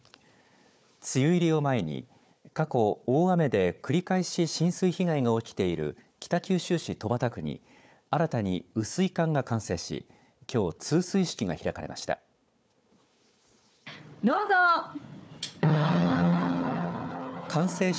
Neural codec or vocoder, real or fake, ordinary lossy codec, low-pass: codec, 16 kHz, 4 kbps, FunCodec, trained on Chinese and English, 50 frames a second; fake; none; none